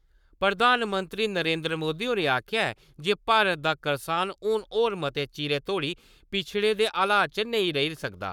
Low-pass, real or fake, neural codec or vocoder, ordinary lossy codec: 19.8 kHz; fake; codec, 44.1 kHz, 7.8 kbps, Pupu-Codec; none